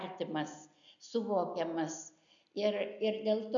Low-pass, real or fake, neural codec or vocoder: 7.2 kHz; real; none